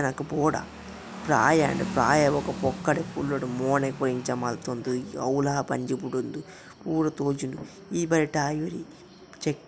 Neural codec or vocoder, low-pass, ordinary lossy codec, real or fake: none; none; none; real